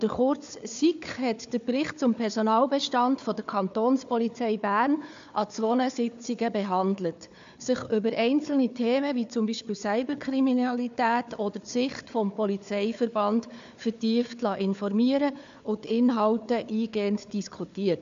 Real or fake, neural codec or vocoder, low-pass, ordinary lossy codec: fake; codec, 16 kHz, 4 kbps, FunCodec, trained on Chinese and English, 50 frames a second; 7.2 kHz; AAC, 64 kbps